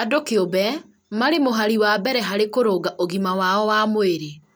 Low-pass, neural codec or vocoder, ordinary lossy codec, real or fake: none; none; none; real